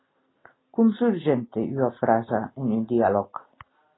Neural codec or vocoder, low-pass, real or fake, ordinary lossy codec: none; 7.2 kHz; real; AAC, 16 kbps